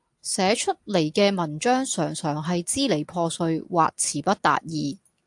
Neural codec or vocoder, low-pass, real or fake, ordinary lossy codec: none; 10.8 kHz; real; AAC, 64 kbps